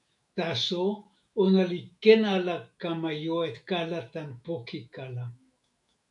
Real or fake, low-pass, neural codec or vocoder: fake; 10.8 kHz; autoencoder, 48 kHz, 128 numbers a frame, DAC-VAE, trained on Japanese speech